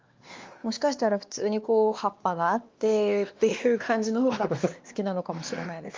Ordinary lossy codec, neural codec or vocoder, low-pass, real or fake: Opus, 32 kbps; codec, 16 kHz, 2 kbps, X-Codec, WavLM features, trained on Multilingual LibriSpeech; 7.2 kHz; fake